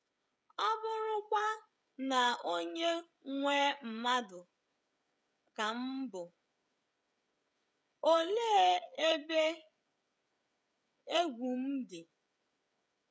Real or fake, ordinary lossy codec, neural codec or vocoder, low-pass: fake; none; codec, 16 kHz, 16 kbps, FreqCodec, smaller model; none